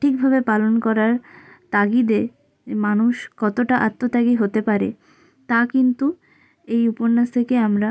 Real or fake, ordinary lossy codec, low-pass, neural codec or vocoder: real; none; none; none